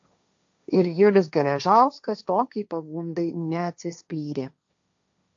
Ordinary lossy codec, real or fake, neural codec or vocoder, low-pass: AAC, 64 kbps; fake; codec, 16 kHz, 1.1 kbps, Voila-Tokenizer; 7.2 kHz